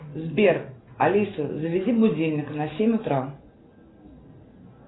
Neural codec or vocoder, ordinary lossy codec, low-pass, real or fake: none; AAC, 16 kbps; 7.2 kHz; real